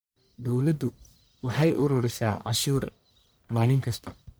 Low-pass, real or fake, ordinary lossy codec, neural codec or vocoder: none; fake; none; codec, 44.1 kHz, 1.7 kbps, Pupu-Codec